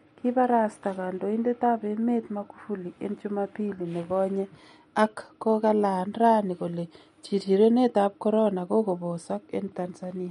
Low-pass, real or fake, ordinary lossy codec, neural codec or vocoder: 19.8 kHz; real; MP3, 48 kbps; none